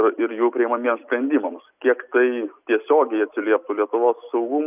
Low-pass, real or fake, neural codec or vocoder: 3.6 kHz; real; none